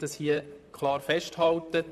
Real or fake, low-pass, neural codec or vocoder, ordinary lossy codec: fake; 14.4 kHz; vocoder, 44.1 kHz, 128 mel bands, Pupu-Vocoder; none